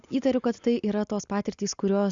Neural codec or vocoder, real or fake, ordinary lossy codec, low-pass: none; real; Opus, 64 kbps; 7.2 kHz